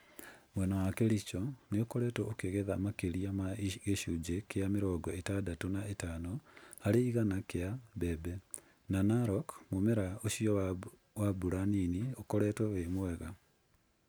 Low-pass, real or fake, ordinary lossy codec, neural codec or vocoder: none; real; none; none